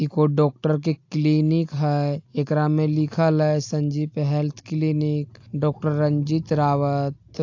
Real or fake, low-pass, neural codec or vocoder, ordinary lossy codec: real; 7.2 kHz; none; AAC, 48 kbps